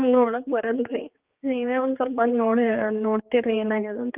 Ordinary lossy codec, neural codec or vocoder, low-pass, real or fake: Opus, 32 kbps; codec, 16 kHz, 4 kbps, X-Codec, HuBERT features, trained on general audio; 3.6 kHz; fake